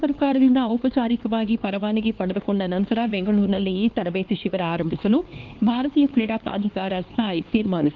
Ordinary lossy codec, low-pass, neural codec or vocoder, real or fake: Opus, 32 kbps; 7.2 kHz; codec, 16 kHz, 2 kbps, X-Codec, HuBERT features, trained on LibriSpeech; fake